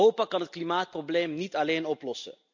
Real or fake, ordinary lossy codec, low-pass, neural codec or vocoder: real; MP3, 64 kbps; 7.2 kHz; none